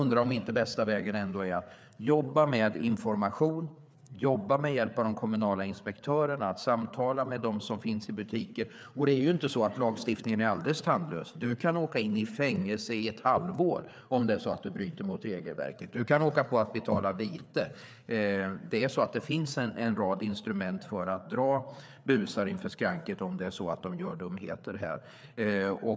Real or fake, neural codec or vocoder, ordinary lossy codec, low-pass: fake; codec, 16 kHz, 4 kbps, FreqCodec, larger model; none; none